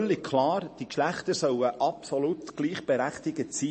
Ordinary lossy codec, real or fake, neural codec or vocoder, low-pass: MP3, 32 kbps; fake; vocoder, 44.1 kHz, 128 mel bands every 512 samples, BigVGAN v2; 10.8 kHz